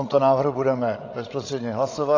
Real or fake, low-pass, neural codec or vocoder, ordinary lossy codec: fake; 7.2 kHz; codec, 16 kHz, 16 kbps, FreqCodec, larger model; AAC, 32 kbps